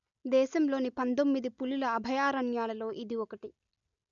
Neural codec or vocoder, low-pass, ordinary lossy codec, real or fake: none; 7.2 kHz; MP3, 96 kbps; real